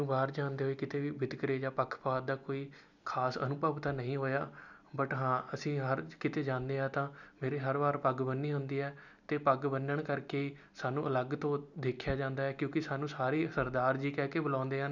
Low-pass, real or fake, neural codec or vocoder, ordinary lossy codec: 7.2 kHz; real; none; none